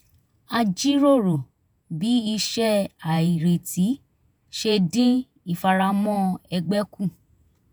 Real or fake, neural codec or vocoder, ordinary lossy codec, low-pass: fake; vocoder, 48 kHz, 128 mel bands, Vocos; none; none